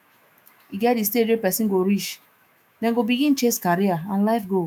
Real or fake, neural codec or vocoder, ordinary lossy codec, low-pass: fake; autoencoder, 48 kHz, 128 numbers a frame, DAC-VAE, trained on Japanese speech; none; none